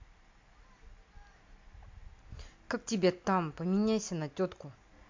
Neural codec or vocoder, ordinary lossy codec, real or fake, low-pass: none; MP3, 48 kbps; real; 7.2 kHz